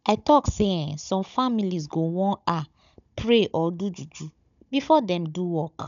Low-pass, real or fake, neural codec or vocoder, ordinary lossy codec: 7.2 kHz; fake; codec, 16 kHz, 16 kbps, FunCodec, trained on Chinese and English, 50 frames a second; none